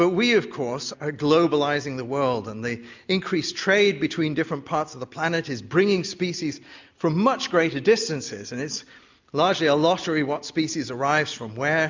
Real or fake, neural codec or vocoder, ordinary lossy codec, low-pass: real; none; MP3, 64 kbps; 7.2 kHz